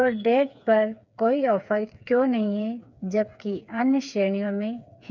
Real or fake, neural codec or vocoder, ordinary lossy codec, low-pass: fake; codec, 16 kHz, 4 kbps, FreqCodec, smaller model; none; 7.2 kHz